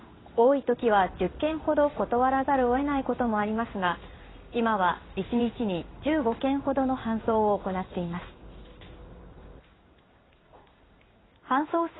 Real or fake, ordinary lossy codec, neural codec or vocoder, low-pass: fake; AAC, 16 kbps; codec, 16 kHz in and 24 kHz out, 1 kbps, XY-Tokenizer; 7.2 kHz